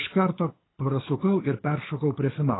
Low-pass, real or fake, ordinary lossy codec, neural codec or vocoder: 7.2 kHz; fake; AAC, 16 kbps; codec, 24 kHz, 6 kbps, HILCodec